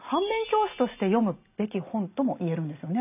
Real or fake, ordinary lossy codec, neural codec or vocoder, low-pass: real; none; none; 3.6 kHz